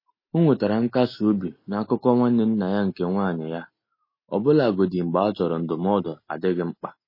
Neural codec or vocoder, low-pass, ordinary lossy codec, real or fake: none; 5.4 kHz; MP3, 24 kbps; real